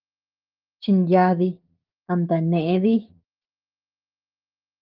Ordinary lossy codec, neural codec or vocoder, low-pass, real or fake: Opus, 32 kbps; codec, 16 kHz, 6 kbps, DAC; 5.4 kHz; fake